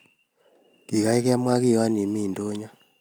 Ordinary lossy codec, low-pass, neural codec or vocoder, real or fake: none; none; none; real